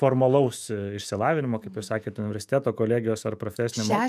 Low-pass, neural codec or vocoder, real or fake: 14.4 kHz; autoencoder, 48 kHz, 128 numbers a frame, DAC-VAE, trained on Japanese speech; fake